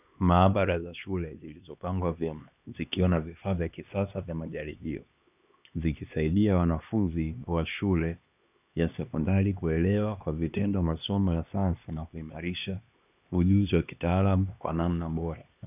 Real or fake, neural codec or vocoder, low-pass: fake; codec, 16 kHz, 2 kbps, X-Codec, HuBERT features, trained on LibriSpeech; 3.6 kHz